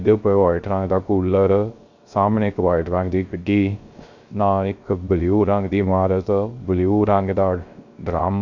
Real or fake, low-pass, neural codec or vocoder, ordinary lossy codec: fake; 7.2 kHz; codec, 16 kHz, 0.3 kbps, FocalCodec; Opus, 64 kbps